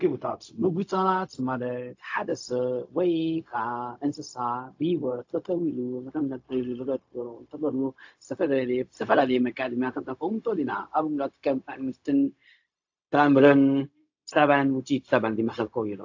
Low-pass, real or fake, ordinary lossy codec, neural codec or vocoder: 7.2 kHz; fake; AAC, 48 kbps; codec, 16 kHz, 0.4 kbps, LongCat-Audio-Codec